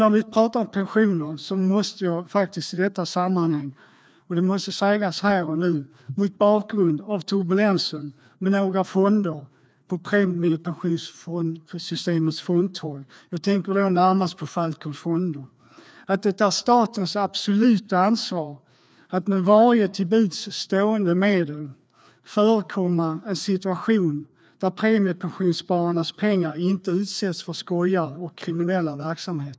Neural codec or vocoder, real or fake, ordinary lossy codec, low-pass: codec, 16 kHz, 2 kbps, FreqCodec, larger model; fake; none; none